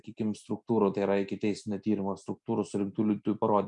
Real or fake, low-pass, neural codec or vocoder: real; 10.8 kHz; none